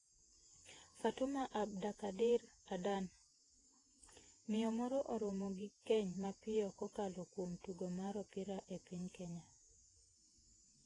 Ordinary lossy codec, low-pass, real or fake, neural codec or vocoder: AAC, 32 kbps; 10.8 kHz; fake; vocoder, 48 kHz, 128 mel bands, Vocos